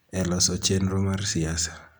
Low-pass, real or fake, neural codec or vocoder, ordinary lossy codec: none; real; none; none